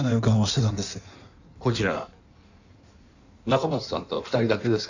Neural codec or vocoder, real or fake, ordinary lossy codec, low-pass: codec, 16 kHz in and 24 kHz out, 1.1 kbps, FireRedTTS-2 codec; fake; none; 7.2 kHz